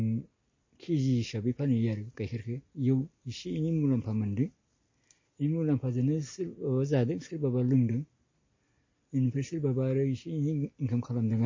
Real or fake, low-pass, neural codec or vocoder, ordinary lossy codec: real; 7.2 kHz; none; MP3, 32 kbps